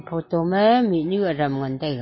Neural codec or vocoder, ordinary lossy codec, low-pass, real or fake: none; MP3, 24 kbps; 7.2 kHz; real